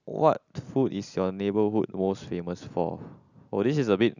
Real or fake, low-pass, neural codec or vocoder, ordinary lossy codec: real; 7.2 kHz; none; none